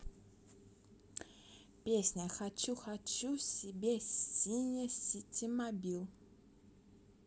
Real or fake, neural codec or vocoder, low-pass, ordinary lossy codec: real; none; none; none